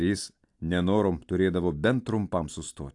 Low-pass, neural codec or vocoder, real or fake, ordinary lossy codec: 10.8 kHz; none; real; AAC, 64 kbps